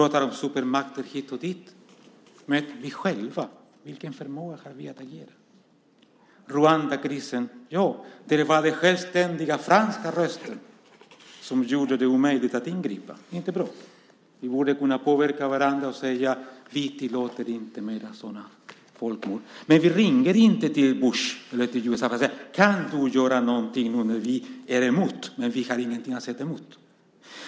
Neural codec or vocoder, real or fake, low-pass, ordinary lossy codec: none; real; none; none